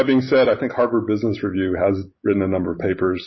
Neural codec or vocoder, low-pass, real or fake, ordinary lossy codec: none; 7.2 kHz; real; MP3, 24 kbps